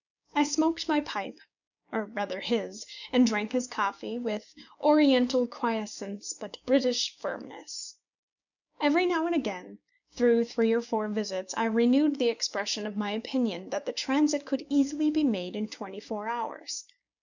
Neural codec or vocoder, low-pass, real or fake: codec, 16 kHz, 6 kbps, DAC; 7.2 kHz; fake